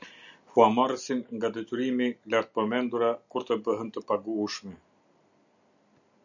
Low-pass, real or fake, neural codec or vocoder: 7.2 kHz; real; none